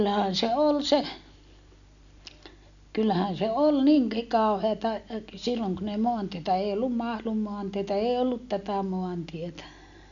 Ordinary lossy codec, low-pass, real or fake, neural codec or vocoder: none; 7.2 kHz; real; none